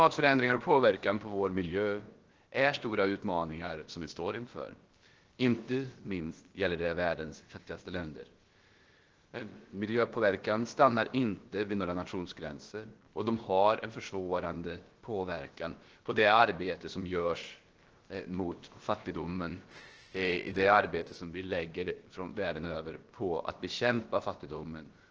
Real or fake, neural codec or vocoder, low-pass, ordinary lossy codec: fake; codec, 16 kHz, about 1 kbps, DyCAST, with the encoder's durations; 7.2 kHz; Opus, 16 kbps